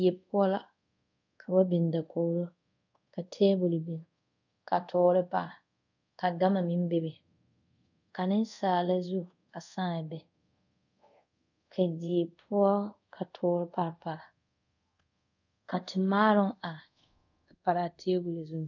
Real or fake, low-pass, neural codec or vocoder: fake; 7.2 kHz; codec, 24 kHz, 0.5 kbps, DualCodec